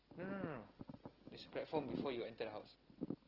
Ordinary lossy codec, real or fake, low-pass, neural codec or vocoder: Opus, 16 kbps; real; 5.4 kHz; none